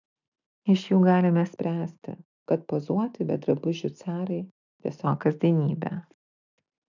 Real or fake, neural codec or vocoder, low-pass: real; none; 7.2 kHz